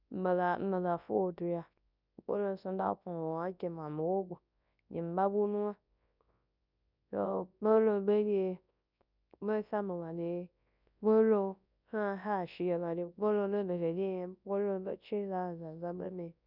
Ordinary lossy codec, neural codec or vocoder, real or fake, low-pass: none; codec, 24 kHz, 0.9 kbps, WavTokenizer, large speech release; fake; 5.4 kHz